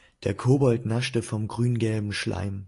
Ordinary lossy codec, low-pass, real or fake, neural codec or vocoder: AAC, 48 kbps; 10.8 kHz; real; none